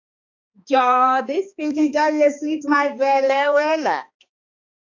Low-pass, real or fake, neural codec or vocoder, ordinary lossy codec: 7.2 kHz; fake; codec, 16 kHz, 2 kbps, X-Codec, HuBERT features, trained on balanced general audio; AAC, 48 kbps